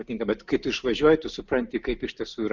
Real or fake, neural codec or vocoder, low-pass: real; none; 7.2 kHz